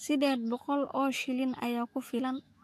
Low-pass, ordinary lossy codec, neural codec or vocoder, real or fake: 14.4 kHz; AAC, 64 kbps; autoencoder, 48 kHz, 128 numbers a frame, DAC-VAE, trained on Japanese speech; fake